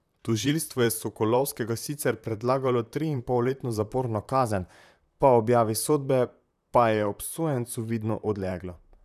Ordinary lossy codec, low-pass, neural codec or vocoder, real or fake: none; 14.4 kHz; vocoder, 44.1 kHz, 128 mel bands, Pupu-Vocoder; fake